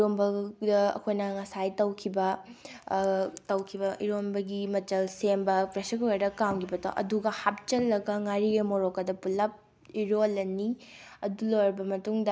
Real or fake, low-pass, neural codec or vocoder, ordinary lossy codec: real; none; none; none